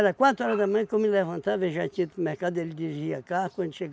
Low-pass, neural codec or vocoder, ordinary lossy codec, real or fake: none; none; none; real